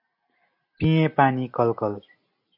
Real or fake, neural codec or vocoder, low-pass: real; none; 5.4 kHz